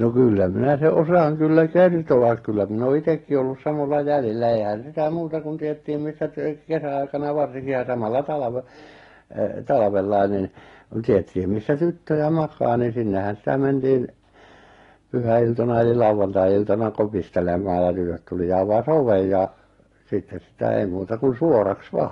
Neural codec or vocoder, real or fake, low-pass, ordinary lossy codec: none; real; 10.8 kHz; AAC, 32 kbps